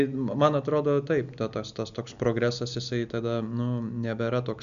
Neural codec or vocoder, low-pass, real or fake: none; 7.2 kHz; real